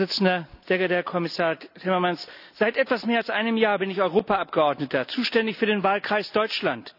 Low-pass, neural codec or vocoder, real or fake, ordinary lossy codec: 5.4 kHz; none; real; none